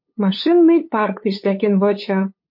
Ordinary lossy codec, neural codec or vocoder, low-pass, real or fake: MP3, 32 kbps; codec, 16 kHz, 8 kbps, FunCodec, trained on LibriTTS, 25 frames a second; 5.4 kHz; fake